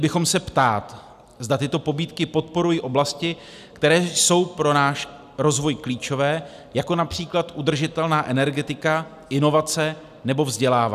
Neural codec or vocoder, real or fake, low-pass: none; real; 14.4 kHz